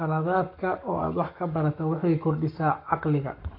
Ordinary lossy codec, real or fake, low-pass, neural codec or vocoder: AAC, 32 kbps; fake; 5.4 kHz; codec, 44.1 kHz, 7.8 kbps, Pupu-Codec